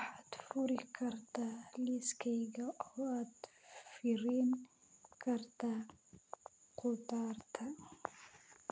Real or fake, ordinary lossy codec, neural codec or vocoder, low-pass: real; none; none; none